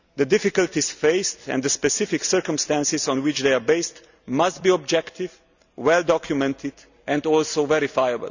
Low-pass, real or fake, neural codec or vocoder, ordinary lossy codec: 7.2 kHz; real; none; none